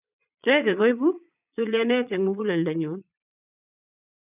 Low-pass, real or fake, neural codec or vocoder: 3.6 kHz; fake; vocoder, 22.05 kHz, 80 mel bands, Vocos